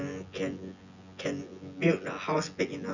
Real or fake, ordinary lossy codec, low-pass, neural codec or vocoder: fake; none; 7.2 kHz; vocoder, 24 kHz, 100 mel bands, Vocos